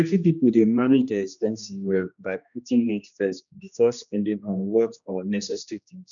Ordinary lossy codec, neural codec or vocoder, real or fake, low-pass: none; codec, 16 kHz, 1 kbps, X-Codec, HuBERT features, trained on general audio; fake; 7.2 kHz